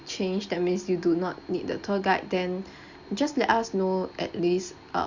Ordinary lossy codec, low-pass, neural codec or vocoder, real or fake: Opus, 64 kbps; 7.2 kHz; none; real